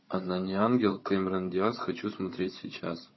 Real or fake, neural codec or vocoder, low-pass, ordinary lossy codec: fake; codec, 16 kHz, 16 kbps, FunCodec, trained on Chinese and English, 50 frames a second; 7.2 kHz; MP3, 24 kbps